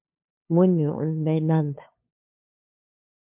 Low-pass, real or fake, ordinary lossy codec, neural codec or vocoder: 3.6 kHz; fake; MP3, 32 kbps; codec, 16 kHz, 2 kbps, FunCodec, trained on LibriTTS, 25 frames a second